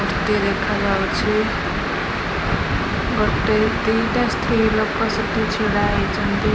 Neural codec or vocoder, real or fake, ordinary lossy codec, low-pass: none; real; none; none